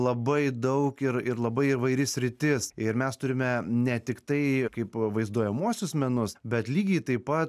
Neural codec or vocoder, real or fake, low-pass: none; real; 14.4 kHz